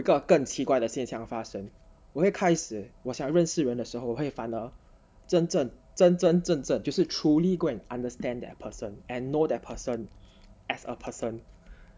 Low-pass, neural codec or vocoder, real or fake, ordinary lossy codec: none; none; real; none